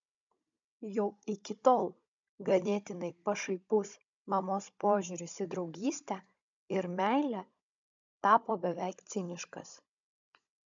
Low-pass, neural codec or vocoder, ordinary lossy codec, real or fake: 7.2 kHz; codec, 16 kHz, 16 kbps, FunCodec, trained on Chinese and English, 50 frames a second; MP3, 64 kbps; fake